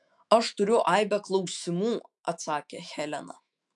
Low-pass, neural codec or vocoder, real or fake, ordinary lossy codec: 10.8 kHz; autoencoder, 48 kHz, 128 numbers a frame, DAC-VAE, trained on Japanese speech; fake; AAC, 64 kbps